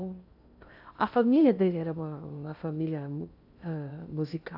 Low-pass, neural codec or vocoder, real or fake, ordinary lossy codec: 5.4 kHz; codec, 16 kHz in and 24 kHz out, 0.6 kbps, FocalCodec, streaming, 2048 codes; fake; AAC, 48 kbps